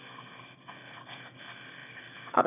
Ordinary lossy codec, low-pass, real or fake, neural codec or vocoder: none; 3.6 kHz; fake; autoencoder, 22.05 kHz, a latent of 192 numbers a frame, VITS, trained on one speaker